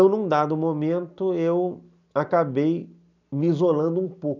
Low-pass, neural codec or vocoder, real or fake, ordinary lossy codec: 7.2 kHz; none; real; none